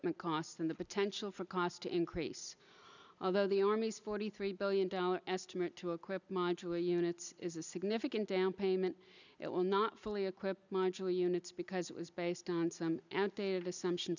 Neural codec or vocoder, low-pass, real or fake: none; 7.2 kHz; real